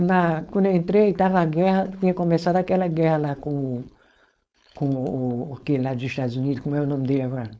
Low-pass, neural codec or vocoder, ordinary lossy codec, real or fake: none; codec, 16 kHz, 4.8 kbps, FACodec; none; fake